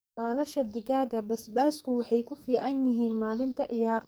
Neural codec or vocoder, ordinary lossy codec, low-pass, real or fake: codec, 44.1 kHz, 2.6 kbps, SNAC; none; none; fake